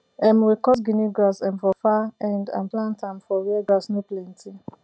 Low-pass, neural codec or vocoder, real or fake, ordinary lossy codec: none; none; real; none